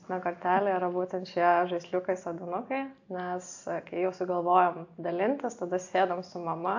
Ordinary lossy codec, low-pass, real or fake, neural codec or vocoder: AAC, 48 kbps; 7.2 kHz; real; none